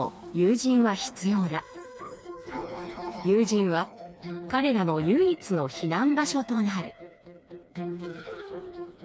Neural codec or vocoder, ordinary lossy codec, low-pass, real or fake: codec, 16 kHz, 2 kbps, FreqCodec, smaller model; none; none; fake